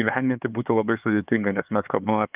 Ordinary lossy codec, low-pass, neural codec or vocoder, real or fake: Opus, 32 kbps; 3.6 kHz; codec, 16 kHz, 4 kbps, X-Codec, HuBERT features, trained on LibriSpeech; fake